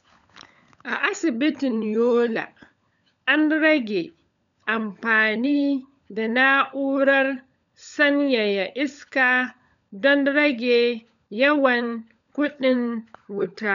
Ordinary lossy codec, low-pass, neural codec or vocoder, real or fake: none; 7.2 kHz; codec, 16 kHz, 16 kbps, FunCodec, trained on LibriTTS, 50 frames a second; fake